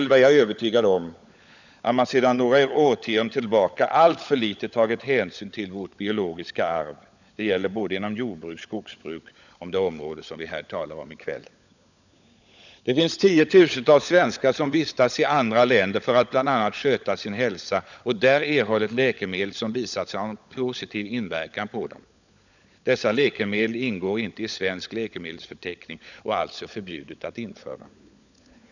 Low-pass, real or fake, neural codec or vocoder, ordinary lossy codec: 7.2 kHz; fake; codec, 16 kHz, 16 kbps, FunCodec, trained on LibriTTS, 50 frames a second; none